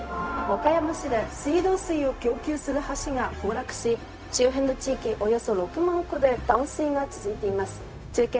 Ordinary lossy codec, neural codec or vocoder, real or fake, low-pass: none; codec, 16 kHz, 0.4 kbps, LongCat-Audio-Codec; fake; none